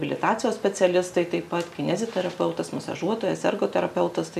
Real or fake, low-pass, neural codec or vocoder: real; 14.4 kHz; none